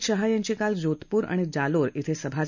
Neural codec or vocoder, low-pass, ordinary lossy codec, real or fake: none; 7.2 kHz; none; real